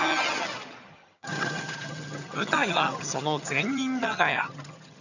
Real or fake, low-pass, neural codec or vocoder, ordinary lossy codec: fake; 7.2 kHz; vocoder, 22.05 kHz, 80 mel bands, HiFi-GAN; none